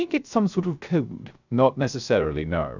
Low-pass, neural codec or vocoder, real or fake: 7.2 kHz; codec, 16 kHz, 0.3 kbps, FocalCodec; fake